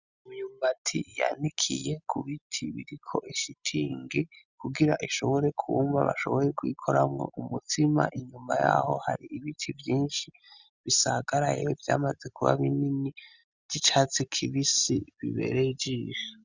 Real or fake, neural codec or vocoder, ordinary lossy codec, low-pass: real; none; Opus, 64 kbps; 7.2 kHz